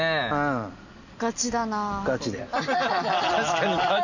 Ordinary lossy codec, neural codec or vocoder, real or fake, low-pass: none; none; real; 7.2 kHz